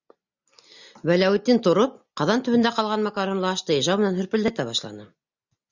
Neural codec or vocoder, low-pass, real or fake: none; 7.2 kHz; real